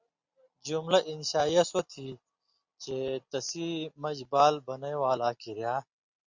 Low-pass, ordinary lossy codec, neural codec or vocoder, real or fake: 7.2 kHz; Opus, 64 kbps; none; real